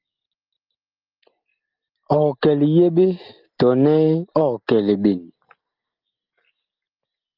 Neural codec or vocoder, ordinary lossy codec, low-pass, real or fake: none; Opus, 24 kbps; 5.4 kHz; real